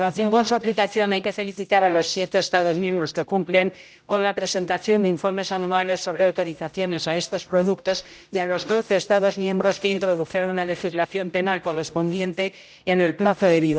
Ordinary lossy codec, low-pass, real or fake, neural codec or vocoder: none; none; fake; codec, 16 kHz, 0.5 kbps, X-Codec, HuBERT features, trained on general audio